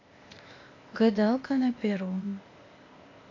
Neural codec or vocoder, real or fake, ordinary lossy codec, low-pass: codec, 16 kHz, 0.8 kbps, ZipCodec; fake; AAC, 32 kbps; 7.2 kHz